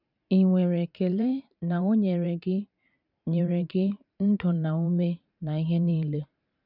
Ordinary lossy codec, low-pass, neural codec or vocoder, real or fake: none; 5.4 kHz; vocoder, 44.1 kHz, 128 mel bands every 512 samples, BigVGAN v2; fake